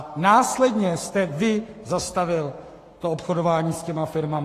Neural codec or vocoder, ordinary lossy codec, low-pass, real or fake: codec, 44.1 kHz, 7.8 kbps, Pupu-Codec; AAC, 48 kbps; 14.4 kHz; fake